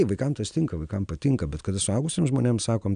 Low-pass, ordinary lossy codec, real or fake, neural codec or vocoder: 9.9 kHz; MP3, 96 kbps; real; none